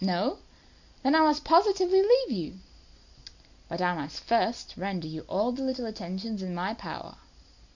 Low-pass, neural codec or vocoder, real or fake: 7.2 kHz; none; real